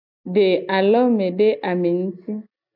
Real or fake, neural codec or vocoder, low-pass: real; none; 5.4 kHz